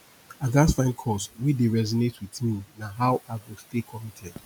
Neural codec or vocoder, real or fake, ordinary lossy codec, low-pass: none; real; none; none